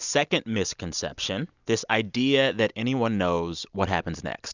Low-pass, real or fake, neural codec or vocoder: 7.2 kHz; real; none